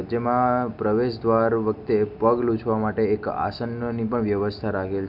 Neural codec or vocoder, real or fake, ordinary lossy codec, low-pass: none; real; none; 5.4 kHz